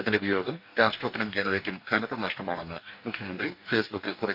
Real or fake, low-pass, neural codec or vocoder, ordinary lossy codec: fake; 5.4 kHz; codec, 44.1 kHz, 2.6 kbps, DAC; none